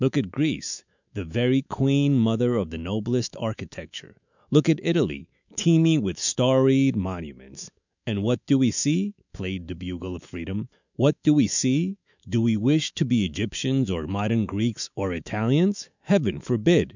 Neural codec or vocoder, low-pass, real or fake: autoencoder, 48 kHz, 128 numbers a frame, DAC-VAE, trained on Japanese speech; 7.2 kHz; fake